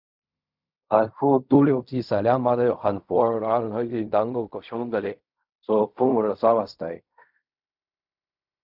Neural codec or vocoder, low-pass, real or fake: codec, 16 kHz in and 24 kHz out, 0.4 kbps, LongCat-Audio-Codec, fine tuned four codebook decoder; 5.4 kHz; fake